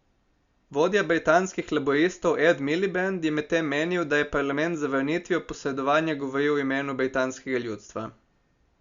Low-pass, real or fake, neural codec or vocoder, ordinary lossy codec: 7.2 kHz; real; none; Opus, 64 kbps